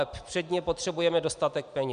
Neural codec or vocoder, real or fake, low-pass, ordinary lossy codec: none; real; 9.9 kHz; MP3, 96 kbps